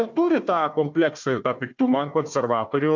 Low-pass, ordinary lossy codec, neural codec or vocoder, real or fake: 7.2 kHz; MP3, 64 kbps; codec, 16 kHz, 1 kbps, FunCodec, trained on Chinese and English, 50 frames a second; fake